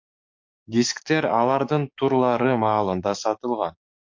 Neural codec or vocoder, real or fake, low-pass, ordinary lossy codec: codec, 44.1 kHz, 7.8 kbps, DAC; fake; 7.2 kHz; MP3, 48 kbps